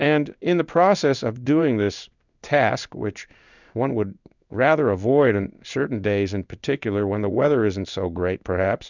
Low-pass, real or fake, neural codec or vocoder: 7.2 kHz; fake; codec, 16 kHz in and 24 kHz out, 1 kbps, XY-Tokenizer